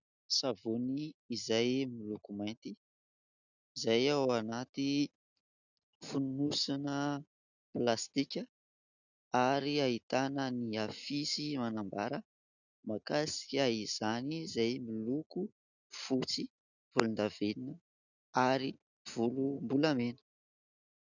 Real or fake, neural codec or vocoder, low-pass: real; none; 7.2 kHz